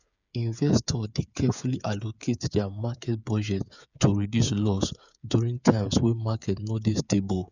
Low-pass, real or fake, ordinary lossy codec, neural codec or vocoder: 7.2 kHz; fake; none; codec, 16 kHz, 8 kbps, FreqCodec, smaller model